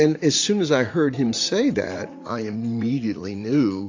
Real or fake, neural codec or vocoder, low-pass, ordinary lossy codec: fake; vocoder, 44.1 kHz, 80 mel bands, Vocos; 7.2 kHz; AAC, 48 kbps